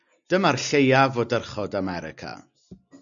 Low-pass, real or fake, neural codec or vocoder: 7.2 kHz; real; none